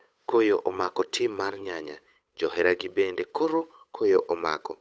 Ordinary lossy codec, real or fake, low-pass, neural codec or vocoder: none; fake; none; codec, 16 kHz, 8 kbps, FunCodec, trained on Chinese and English, 25 frames a second